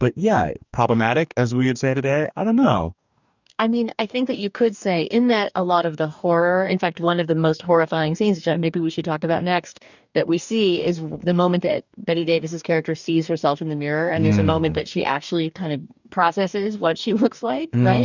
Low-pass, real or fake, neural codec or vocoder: 7.2 kHz; fake; codec, 44.1 kHz, 2.6 kbps, DAC